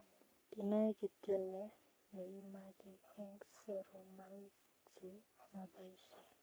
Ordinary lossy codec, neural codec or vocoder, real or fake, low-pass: none; codec, 44.1 kHz, 3.4 kbps, Pupu-Codec; fake; none